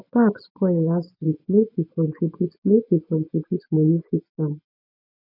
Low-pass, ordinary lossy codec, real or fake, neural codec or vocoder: 5.4 kHz; none; fake; vocoder, 24 kHz, 100 mel bands, Vocos